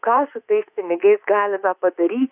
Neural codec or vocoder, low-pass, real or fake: autoencoder, 48 kHz, 32 numbers a frame, DAC-VAE, trained on Japanese speech; 3.6 kHz; fake